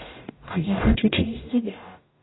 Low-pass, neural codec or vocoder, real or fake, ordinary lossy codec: 7.2 kHz; codec, 44.1 kHz, 0.9 kbps, DAC; fake; AAC, 16 kbps